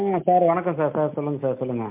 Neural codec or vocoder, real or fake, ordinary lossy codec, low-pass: none; real; MP3, 32 kbps; 3.6 kHz